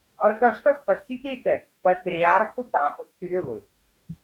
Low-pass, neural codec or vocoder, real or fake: 19.8 kHz; codec, 44.1 kHz, 2.6 kbps, DAC; fake